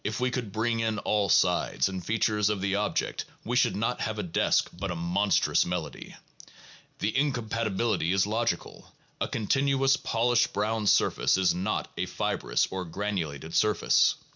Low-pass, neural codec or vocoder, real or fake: 7.2 kHz; none; real